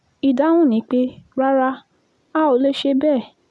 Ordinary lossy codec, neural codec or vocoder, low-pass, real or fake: none; none; none; real